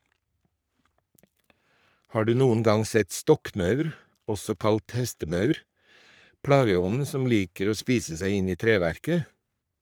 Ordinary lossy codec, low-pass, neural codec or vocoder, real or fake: none; none; codec, 44.1 kHz, 3.4 kbps, Pupu-Codec; fake